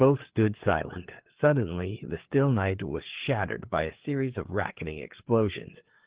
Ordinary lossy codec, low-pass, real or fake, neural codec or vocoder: Opus, 16 kbps; 3.6 kHz; fake; codec, 16 kHz, 4 kbps, FreqCodec, larger model